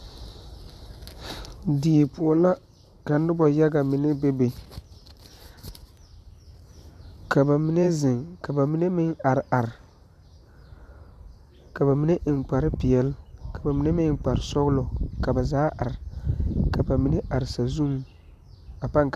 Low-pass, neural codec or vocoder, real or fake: 14.4 kHz; vocoder, 44.1 kHz, 128 mel bands every 512 samples, BigVGAN v2; fake